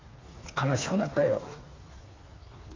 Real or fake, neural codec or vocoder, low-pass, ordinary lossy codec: fake; codec, 16 kHz, 4 kbps, FreqCodec, smaller model; 7.2 kHz; AAC, 32 kbps